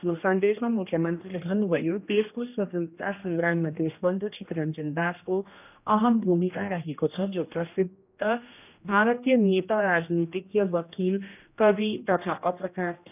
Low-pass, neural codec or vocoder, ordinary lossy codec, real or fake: 3.6 kHz; codec, 16 kHz, 1 kbps, X-Codec, HuBERT features, trained on general audio; none; fake